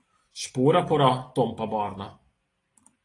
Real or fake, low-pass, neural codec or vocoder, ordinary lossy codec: real; 10.8 kHz; none; AAC, 32 kbps